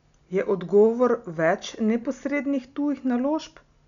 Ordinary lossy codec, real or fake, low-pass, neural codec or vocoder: none; real; 7.2 kHz; none